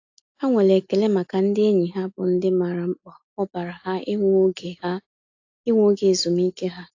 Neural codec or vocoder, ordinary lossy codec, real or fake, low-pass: none; AAC, 48 kbps; real; 7.2 kHz